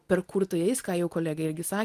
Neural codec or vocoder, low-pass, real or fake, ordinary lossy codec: none; 14.4 kHz; real; Opus, 24 kbps